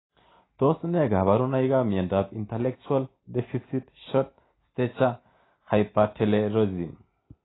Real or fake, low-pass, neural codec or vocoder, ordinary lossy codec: real; 7.2 kHz; none; AAC, 16 kbps